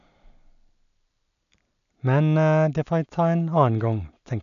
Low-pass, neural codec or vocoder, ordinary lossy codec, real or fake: 7.2 kHz; none; none; real